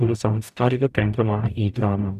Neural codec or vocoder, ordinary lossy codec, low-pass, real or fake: codec, 44.1 kHz, 0.9 kbps, DAC; none; 14.4 kHz; fake